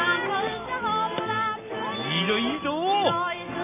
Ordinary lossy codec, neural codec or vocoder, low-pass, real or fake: none; none; 3.6 kHz; real